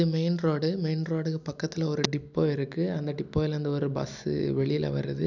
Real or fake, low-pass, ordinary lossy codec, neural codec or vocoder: real; 7.2 kHz; none; none